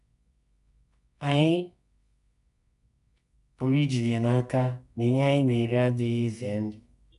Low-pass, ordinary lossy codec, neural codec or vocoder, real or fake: 10.8 kHz; none; codec, 24 kHz, 0.9 kbps, WavTokenizer, medium music audio release; fake